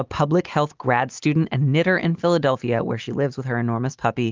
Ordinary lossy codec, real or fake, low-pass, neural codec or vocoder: Opus, 32 kbps; real; 7.2 kHz; none